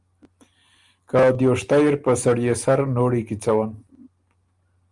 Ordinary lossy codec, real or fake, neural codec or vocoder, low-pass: Opus, 32 kbps; real; none; 10.8 kHz